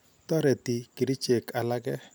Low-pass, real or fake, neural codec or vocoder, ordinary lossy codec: none; real; none; none